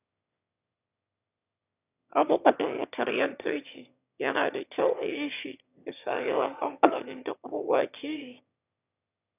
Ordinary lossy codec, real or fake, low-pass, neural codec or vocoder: none; fake; 3.6 kHz; autoencoder, 22.05 kHz, a latent of 192 numbers a frame, VITS, trained on one speaker